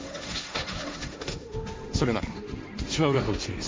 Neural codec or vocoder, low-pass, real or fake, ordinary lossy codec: codec, 16 kHz, 1.1 kbps, Voila-Tokenizer; none; fake; none